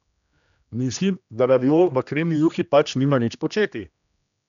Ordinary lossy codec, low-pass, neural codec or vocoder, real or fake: none; 7.2 kHz; codec, 16 kHz, 1 kbps, X-Codec, HuBERT features, trained on general audio; fake